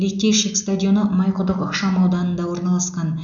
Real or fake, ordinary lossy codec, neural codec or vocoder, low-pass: real; none; none; 7.2 kHz